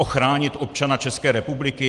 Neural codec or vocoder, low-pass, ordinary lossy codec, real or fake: none; 10.8 kHz; Opus, 24 kbps; real